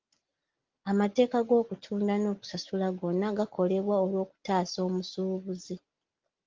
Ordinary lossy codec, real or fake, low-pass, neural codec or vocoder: Opus, 32 kbps; real; 7.2 kHz; none